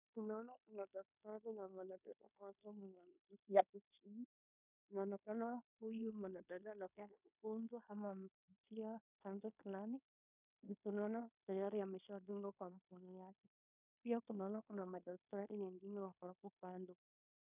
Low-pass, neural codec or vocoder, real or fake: 3.6 kHz; codec, 16 kHz in and 24 kHz out, 0.9 kbps, LongCat-Audio-Codec, fine tuned four codebook decoder; fake